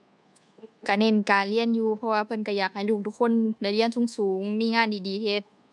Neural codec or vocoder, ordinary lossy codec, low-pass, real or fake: codec, 24 kHz, 1.2 kbps, DualCodec; none; none; fake